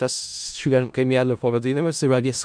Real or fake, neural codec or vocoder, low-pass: fake; codec, 16 kHz in and 24 kHz out, 0.4 kbps, LongCat-Audio-Codec, four codebook decoder; 9.9 kHz